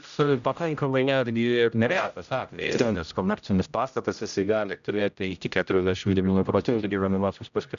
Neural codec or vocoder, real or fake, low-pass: codec, 16 kHz, 0.5 kbps, X-Codec, HuBERT features, trained on general audio; fake; 7.2 kHz